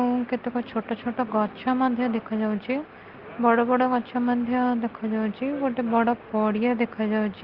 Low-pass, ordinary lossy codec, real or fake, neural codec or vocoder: 5.4 kHz; Opus, 16 kbps; real; none